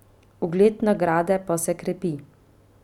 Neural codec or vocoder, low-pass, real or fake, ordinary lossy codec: none; 19.8 kHz; real; none